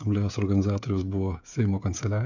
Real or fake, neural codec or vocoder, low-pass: fake; codec, 16 kHz, 16 kbps, FreqCodec, smaller model; 7.2 kHz